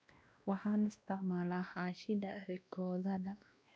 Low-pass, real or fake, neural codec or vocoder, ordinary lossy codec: none; fake; codec, 16 kHz, 1 kbps, X-Codec, WavLM features, trained on Multilingual LibriSpeech; none